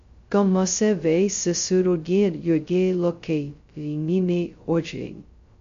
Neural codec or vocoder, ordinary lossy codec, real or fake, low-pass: codec, 16 kHz, 0.2 kbps, FocalCodec; MP3, 48 kbps; fake; 7.2 kHz